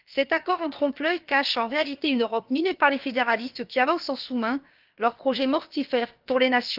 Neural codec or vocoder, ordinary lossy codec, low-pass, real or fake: codec, 16 kHz, about 1 kbps, DyCAST, with the encoder's durations; Opus, 24 kbps; 5.4 kHz; fake